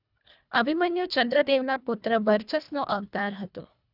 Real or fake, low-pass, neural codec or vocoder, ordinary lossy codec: fake; 5.4 kHz; codec, 24 kHz, 1.5 kbps, HILCodec; none